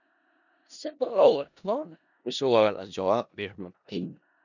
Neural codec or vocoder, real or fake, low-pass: codec, 16 kHz in and 24 kHz out, 0.4 kbps, LongCat-Audio-Codec, four codebook decoder; fake; 7.2 kHz